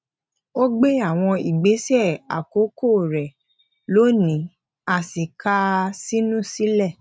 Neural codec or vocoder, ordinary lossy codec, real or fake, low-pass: none; none; real; none